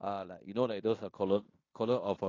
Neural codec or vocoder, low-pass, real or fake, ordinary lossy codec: codec, 16 kHz in and 24 kHz out, 0.9 kbps, LongCat-Audio-Codec, fine tuned four codebook decoder; 7.2 kHz; fake; AAC, 32 kbps